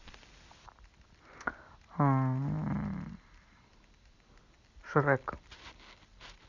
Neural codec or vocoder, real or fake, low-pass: none; real; 7.2 kHz